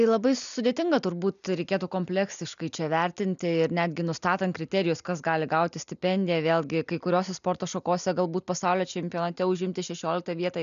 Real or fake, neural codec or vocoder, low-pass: real; none; 7.2 kHz